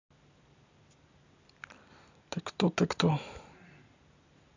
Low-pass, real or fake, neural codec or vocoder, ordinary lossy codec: 7.2 kHz; fake; vocoder, 44.1 kHz, 128 mel bands every 256 samples, BigVGAN v2; AAC, 48 kbps